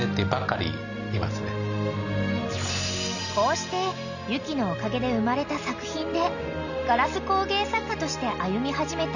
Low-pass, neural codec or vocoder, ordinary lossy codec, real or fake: 7.2 kHz; none; none; real